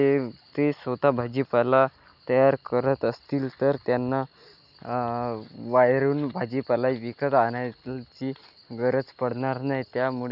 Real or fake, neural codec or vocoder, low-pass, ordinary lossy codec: real; none; 5.4 kHz; none